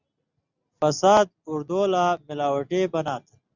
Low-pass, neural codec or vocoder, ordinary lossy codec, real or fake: 7.2 kHz; none; Opus, 64 kbps; real